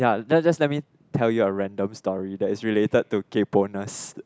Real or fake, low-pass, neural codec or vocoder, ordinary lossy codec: real; none; none; none